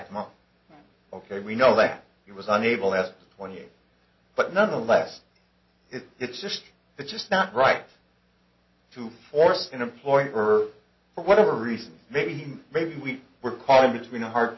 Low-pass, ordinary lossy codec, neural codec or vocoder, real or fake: 7.2 kHz; MP3, 24 kbps; none; real